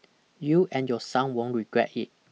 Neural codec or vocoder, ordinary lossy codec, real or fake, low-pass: none; none; real; none